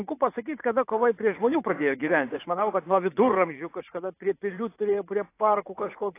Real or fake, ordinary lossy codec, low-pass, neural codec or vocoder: fake; AAC, 24 kbps; 3.6 kHz; vocoder, 44.1 kHz, 80 mel bands, Vocos